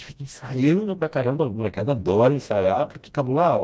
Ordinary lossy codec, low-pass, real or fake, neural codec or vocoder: none; none; fake; codec, 16 kHz, 1 kbps, FreqCodec, smaller model